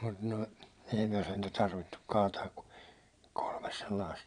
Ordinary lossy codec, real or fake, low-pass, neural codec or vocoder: none; fake; 9.9 kHz; vocoder, 22.05 kHz, 80 mel bands, Vocos